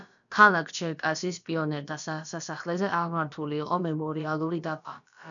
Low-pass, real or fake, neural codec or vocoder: 7.2 kHz; fake; codec, 16 kHz, about 1 kbps, DyCAST, with the encoder's durations